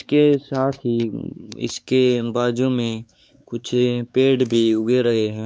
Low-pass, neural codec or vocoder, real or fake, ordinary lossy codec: none; codec, 16 kHz, 4 kbps, X-Codec, WavLM features, trained on Multilingual LibriSpeech; fake; none